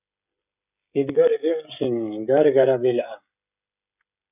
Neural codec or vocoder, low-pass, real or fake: codec, 16 kHz, 16 kbps, FreqCodec, smaller model; 3.6 kHz; fake